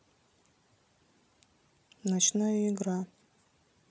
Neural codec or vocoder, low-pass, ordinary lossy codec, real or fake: none; none; none; real